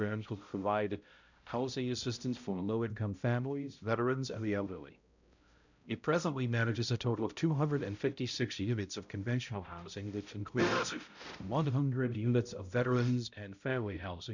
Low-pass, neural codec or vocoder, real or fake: 7.2 kHz; codec, 16 kHz, 0.5 kbps, X-Codec, HuBERT features, trained on balanced general audio; fake